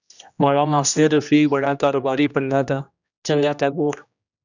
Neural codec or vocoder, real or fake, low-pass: codec, 16 kHz, 1 kbps, X-Codec, HuBERT features, trained on general audio; fake; 7.2 kHz